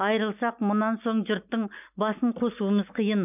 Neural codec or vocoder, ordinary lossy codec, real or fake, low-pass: none; none; real; 3.6 kHz